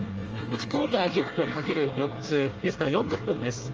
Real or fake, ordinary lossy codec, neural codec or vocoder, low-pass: fake; Opus, 24 kbps; codec, 24 kHz, 1 kbps, SNAC; 7.2 kHz